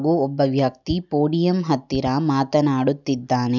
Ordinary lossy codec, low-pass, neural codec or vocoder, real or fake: none; 7.2 kHz; none; real